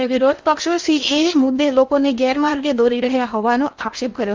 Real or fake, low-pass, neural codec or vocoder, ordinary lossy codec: fake; 7.2 kHz; codec, 16 kHz in and 24 kHz out, 0.8 kbps, FocalCodec, streaming, 65536 codes; Opus, 32 kbps